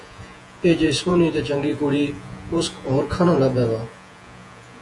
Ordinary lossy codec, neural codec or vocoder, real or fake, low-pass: AAC, 64 kbps; vocoder, 48 kHz, 128 mel bands, Vocos; fake; 10.8 kHz